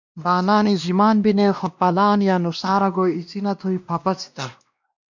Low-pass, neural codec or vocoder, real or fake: 7.2 kHz; codec, 16 kHz, 1 kbps, X-Codec, WavLM features, trained on Multilingual LibriSpeech; fake